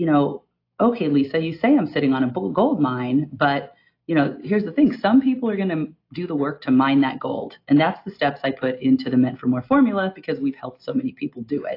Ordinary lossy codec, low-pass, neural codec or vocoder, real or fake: AAC, 32 kbps; 5.4 kHz; none; real